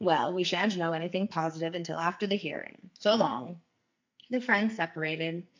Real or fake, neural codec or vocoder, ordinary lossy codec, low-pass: fake; codec, 44.1 kHz, 2.6 kbps, SNAC; MP3, 64 kbps; 7.2 kHz